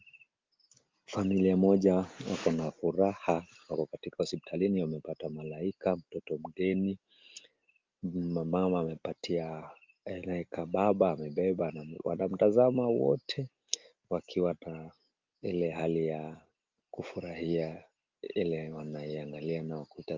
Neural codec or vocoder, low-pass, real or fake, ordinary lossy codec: none; 7.2 kHz; real; Opus, 24 kbps